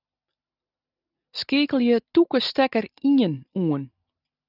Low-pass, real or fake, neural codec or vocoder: 5.4 kHz; real; none